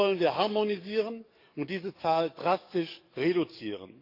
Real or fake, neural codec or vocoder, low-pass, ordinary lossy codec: fake; codec, 44.1 kHz, 7.8 kbps, DAC; 5.4 kHz; AAC, 32 kbps